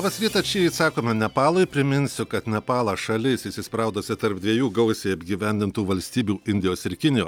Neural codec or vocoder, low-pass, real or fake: none; 19.8 kHz; real